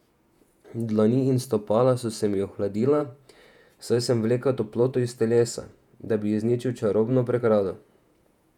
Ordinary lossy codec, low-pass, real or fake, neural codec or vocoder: none; 19.8 kHz; fake; vocoder, 48 kHz, 128 mel bands, Vocos